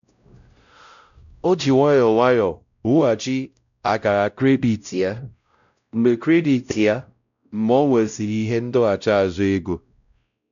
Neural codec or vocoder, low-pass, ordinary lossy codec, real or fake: codec, 16 kHz, 0.5 kbps, X-Codec, WavLM features, trained on Multilingual LibriSpeech; 7.2 kHz; none; fake